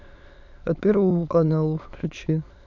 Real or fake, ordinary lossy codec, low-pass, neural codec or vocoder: fake; none; 7.2 kHz; autoencoder, 22.05 kHz, a latent of 192 numbers a frame, VITS, trained on many speakers